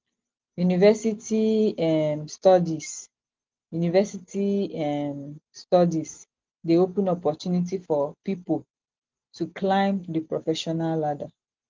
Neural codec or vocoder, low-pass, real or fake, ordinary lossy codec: none; 7.2 kHz; real; Opus, 32 kbps